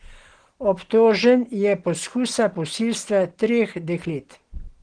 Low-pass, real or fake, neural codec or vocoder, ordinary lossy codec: 9.9 kHz; real; none; Opus, 16 kbps